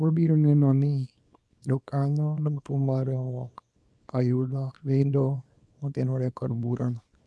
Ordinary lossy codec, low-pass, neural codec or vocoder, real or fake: none; none; codec, 24 kHz, 0.9 kbps, WavTokenizer, small release; fake